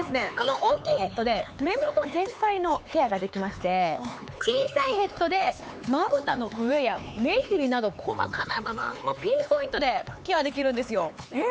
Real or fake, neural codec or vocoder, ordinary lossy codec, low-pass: fake; codec, 16 kHz, 4 kbps, X-Codec, HuBERT features, trained on LibriSpeech; none; none